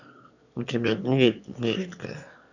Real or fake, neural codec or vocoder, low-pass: fake; autoencoder, 22.05 kHz, a latent of 192 numbers a frame, VITS, trained on one speaker; 7.2 kHz